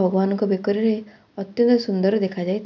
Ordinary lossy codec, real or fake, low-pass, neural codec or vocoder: none; real; 7.2 kHz; none